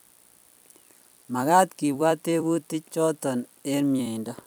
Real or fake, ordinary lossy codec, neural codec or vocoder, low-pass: fake; none; vocoder, 44.1 kHz, 128 mel bands every 256 samples, BigVGAN v2; none